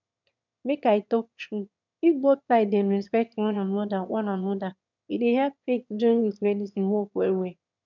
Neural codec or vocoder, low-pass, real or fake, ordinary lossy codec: autoencoder, 22.05 kHz, a latent of 192 numbers a frame, VITS, trained on one speaker; 7.2 kHz; fake; none